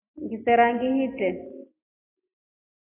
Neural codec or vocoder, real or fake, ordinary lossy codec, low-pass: none; real; AAC, 16 kbps; 3.6 kHz